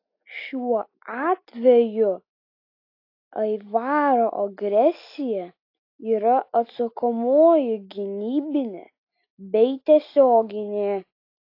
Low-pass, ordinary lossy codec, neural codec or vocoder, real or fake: 5.4 kHz; AAC, 32 kbps; none; real